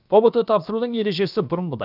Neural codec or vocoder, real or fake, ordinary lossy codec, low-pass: codec, 16 kHz, about 1 kbps, DyCAST, with the encoder's durations; fake; none; 5.4 kHz